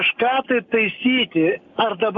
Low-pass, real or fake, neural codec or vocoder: 7.2 kHz; real; none